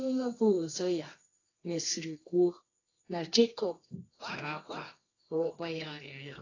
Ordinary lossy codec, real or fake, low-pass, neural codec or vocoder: AAC, 32 kbps; fake; 7.2 kHz; codec, 24 kHz, 0.9 kbps, WavTokenizer, medium music audio release